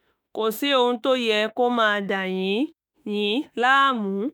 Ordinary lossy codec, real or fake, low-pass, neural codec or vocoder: none; fake; none; autoencoder, 48 kHz, 32 numbers a frame, DAC-VAE, trained on Japanese speech